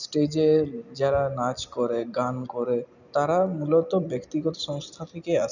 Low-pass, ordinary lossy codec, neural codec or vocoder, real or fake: 7.2 kHz; none; none; real